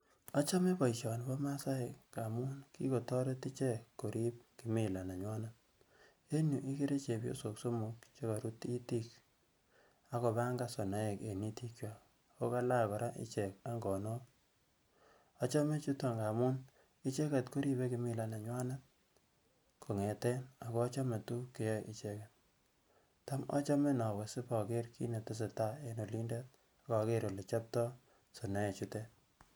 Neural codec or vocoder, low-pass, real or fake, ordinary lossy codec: none; none; real; none